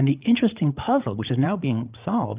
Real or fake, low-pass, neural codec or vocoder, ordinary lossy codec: fake; 3.6 kHz; codec, 16 kHz, 8 kbps, FreqCodec, larger model; Opus, 24 kbps